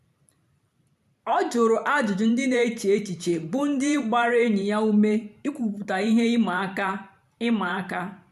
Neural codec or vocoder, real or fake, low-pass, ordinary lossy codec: vocoder, 44.1 kHz, 128 mel bands every 512 samples, BigVGAN v2; fake; 14.4 kHz; none